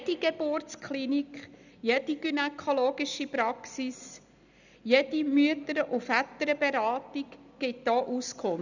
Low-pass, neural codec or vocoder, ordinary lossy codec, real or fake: 7.2 kHz; none; none; real